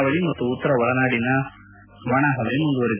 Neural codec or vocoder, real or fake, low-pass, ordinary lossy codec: none; real; 3.6 kHz; none